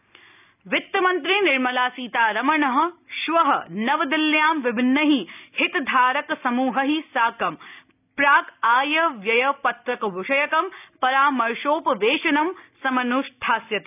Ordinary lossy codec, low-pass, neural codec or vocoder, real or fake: none; 3.6 kHz; none; real